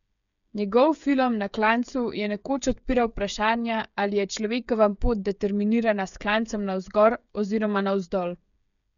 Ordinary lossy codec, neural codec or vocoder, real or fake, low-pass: MP3, 96 kbps; codec, 16 kHz, 8 kbps, FreqCodec, smaller model; fake; 7.2 kHz